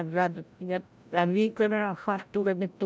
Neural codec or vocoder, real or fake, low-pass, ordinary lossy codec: codec, 16 kHz, 0.5 kbps, FreqCodec, larger model; fake; none; none